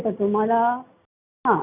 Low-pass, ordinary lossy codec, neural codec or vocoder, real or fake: 3.6 kHz; none; none; real